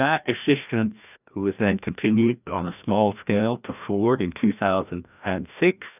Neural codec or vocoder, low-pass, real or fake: codec, 16 kHz, 1 kbps, FreqCodec, larger model; 3.6 kHz; fake